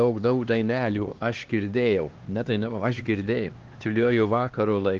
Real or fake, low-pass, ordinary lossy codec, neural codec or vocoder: fake; 7.2 kHz; Opus, 16 kbps; codec, 16 kHz, 2 kbps, X-Codec, HuBERT features, trained on LibriSpeech